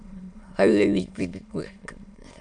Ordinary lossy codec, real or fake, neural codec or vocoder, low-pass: MP3, 96 kbps; fake; autoencoder, 22.05 kHz, a latent of 192 numbers a frame, VITS, trained on many speakers; 9.9 kHz